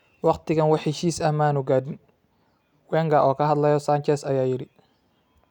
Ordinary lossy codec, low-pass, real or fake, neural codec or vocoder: none; 19.8 kHz; real; none